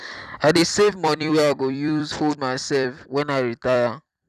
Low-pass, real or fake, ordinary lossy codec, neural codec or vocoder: 14.4 kHz; fake; none; vocoder, 44.1 kHz, 128 mel bands every 256 samples, BigVGAN v2